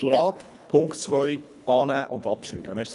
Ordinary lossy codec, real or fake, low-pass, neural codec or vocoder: none; fake; 10.8 kHz; codec, 24 kHz, 1.5 kbps, HILCodec